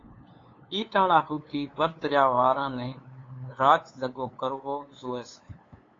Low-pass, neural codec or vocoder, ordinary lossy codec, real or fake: 7.2 kHz; codec, 16 kHz, 8 kbps, FunCodec, trained on LibriTTS, 25 frames a second; AAC, 32 kbps; fake